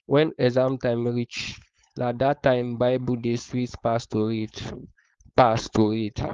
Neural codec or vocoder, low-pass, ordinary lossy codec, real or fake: codec, 16 kHz, 4.8 kbps, FACodec; 7.2 kHz; Opus, 32 kbps; fake